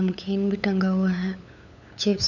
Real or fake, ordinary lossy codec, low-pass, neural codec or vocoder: fake; none; 7.2 kHz; codec, 16 kHz, 8 kbps, FunCodec, trained on LibriTTS, 25 frames a second